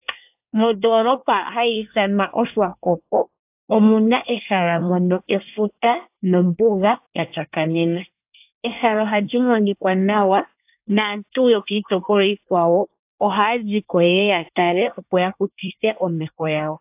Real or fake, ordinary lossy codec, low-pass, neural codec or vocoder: fake; AAC, 32 kbps; 3.6 kHz; codec, 24 kHz, 1 kbps, SNAC